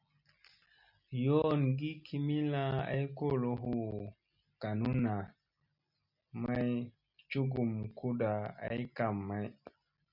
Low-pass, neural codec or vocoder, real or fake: 5.4 kHz; none; real